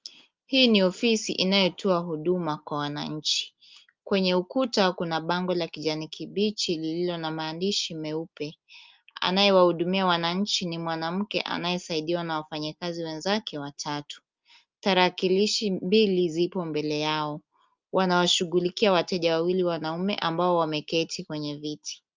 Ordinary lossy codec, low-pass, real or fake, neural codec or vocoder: Opus, 24 kbps; 7.2 kHz; real; none